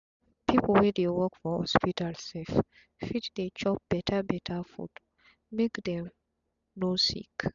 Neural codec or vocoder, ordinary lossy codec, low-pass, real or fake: none; none; 7.2 kHz; real